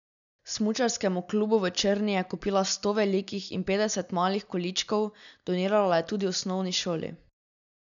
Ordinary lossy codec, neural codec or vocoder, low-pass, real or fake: none; none; 7.2 kHz; real